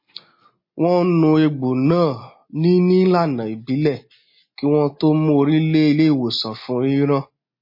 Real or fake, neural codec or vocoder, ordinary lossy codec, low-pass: real; none; MP3, 24 kbps; 5.4 kHz